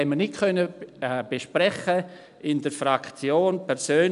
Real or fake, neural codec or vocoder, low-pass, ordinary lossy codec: real; none; 10.8 kHz; none